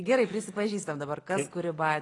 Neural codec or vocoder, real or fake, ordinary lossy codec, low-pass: none; real; AAC, 32 kbps; 10.8 kHz